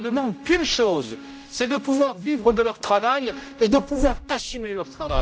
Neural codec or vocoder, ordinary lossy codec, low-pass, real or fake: codec, 16 kHz, 0.5 kbps, X-Codec, HuBERT features, trained on general audio; none; none; fake